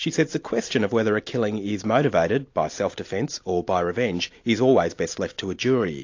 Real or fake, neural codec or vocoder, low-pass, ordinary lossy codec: real; none; 7.2 kHz; AAC, 48 kbps